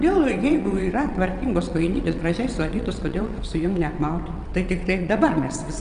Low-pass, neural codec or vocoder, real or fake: 9.9 kHz; vocoder, 22.05 kHz, 80 mel bands, WaveNeXt; fake